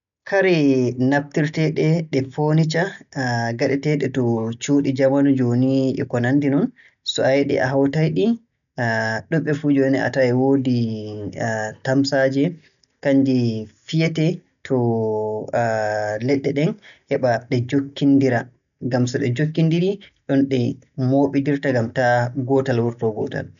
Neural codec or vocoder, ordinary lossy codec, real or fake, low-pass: none; none; real; 7.2 kHz